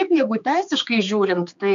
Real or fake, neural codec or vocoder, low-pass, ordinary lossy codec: fake; codec, 16 kHz, 4 kbps, X-Codec, HuBERT features, trained on general audio; 7.2 kHz; MP3, 64 kbps